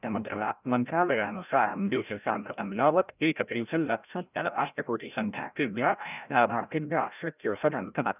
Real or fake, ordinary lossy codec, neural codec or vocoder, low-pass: fake; none; codec, 16 kHz, 0.5 kbps, FreqCodec, larger model; 3.6 kHz